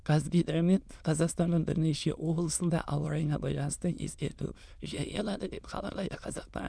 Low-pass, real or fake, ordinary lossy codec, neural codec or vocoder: none; fake; none; autoencoder, 22.05 kHz, a latent of 192 numbers a frame, VITS, trained on many speakers